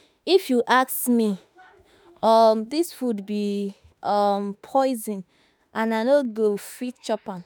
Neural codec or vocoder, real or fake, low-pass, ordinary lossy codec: autoencoder, 48 kHz, 32 numbers a frame, DAC-VAE, trained on Japanese speech; fake; none; none